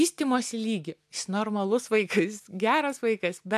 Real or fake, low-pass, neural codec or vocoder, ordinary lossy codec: real; 14.4 kHz; none; MP3, 96 kbps